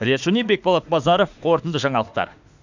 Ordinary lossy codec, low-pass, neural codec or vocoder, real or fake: none; 7.2 kHz; autoencoder, 48 kHz, 32 numbers a frame, DAC-VAE, trained on Japanese speech; fake